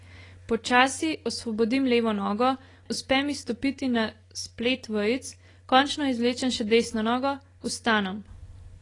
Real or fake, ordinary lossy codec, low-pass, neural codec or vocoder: real; AAC, 32 kbps; 10.8 kHz; none